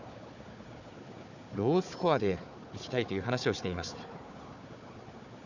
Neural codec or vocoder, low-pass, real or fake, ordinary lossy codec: codec, 16 kHz, 4 kbps, FunCodec, trained on Chinese and English, 50 frames a second; 7.2 kHz; fake; none